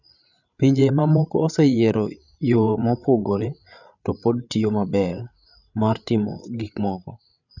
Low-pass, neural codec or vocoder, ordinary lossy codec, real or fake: 7.2 kHz; codec, 16 kHz, 8 kbps, FreqCodec, larger model; none; fake